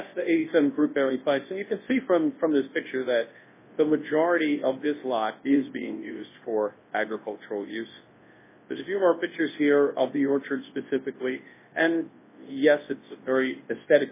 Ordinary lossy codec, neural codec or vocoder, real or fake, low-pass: MP3, 16 kbps; codec, 24 kHz, 0.9 kbps, WavTokenizer, large speech release; fake; 3.6 kHz